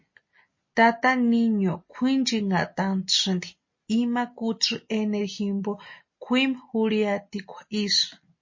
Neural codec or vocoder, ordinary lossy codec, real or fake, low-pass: none; MP3, 32 kbps; real; 7.2 kHz